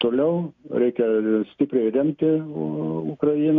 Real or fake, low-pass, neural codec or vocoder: real; 7.2 kHz; none